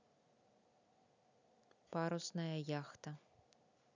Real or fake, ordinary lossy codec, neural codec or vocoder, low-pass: real; none; none; 7.2 kHz